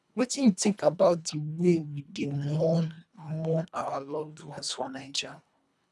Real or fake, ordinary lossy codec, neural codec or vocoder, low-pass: fake; none; codec, 24 kHz, 1.5 kbps, HILCodec; none